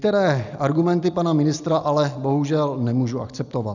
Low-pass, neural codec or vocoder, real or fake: 7.2 kHz; none; real